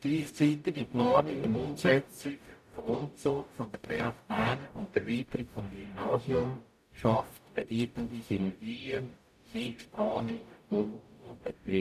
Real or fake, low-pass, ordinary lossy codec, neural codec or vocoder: fake; 14.4 kHz; none; codec, 44.1 kHz, 0.9 kbps, DAC